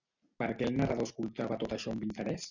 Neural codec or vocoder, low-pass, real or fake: none; 7.2 kHz; real